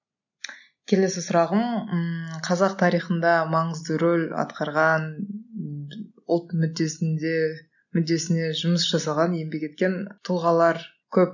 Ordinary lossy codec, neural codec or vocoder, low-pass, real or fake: none; none; 7.2 kHz; real